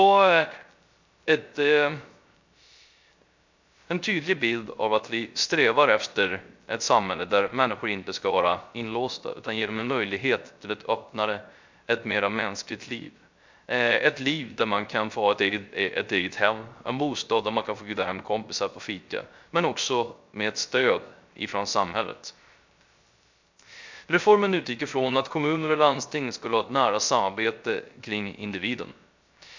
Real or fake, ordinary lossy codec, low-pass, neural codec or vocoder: fake; MP3, 64 kbps; 7.2 kHz; codec, 16 kHz, 0.3 kbps, FocalCodec